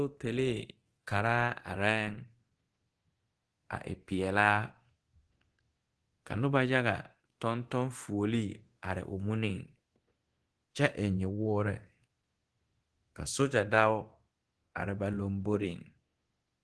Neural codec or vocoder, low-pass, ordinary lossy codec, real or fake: codec, 24 kHz, 0.9 kbps, DualCodec; 10.8 kHz; Opus, 16 kbps; fake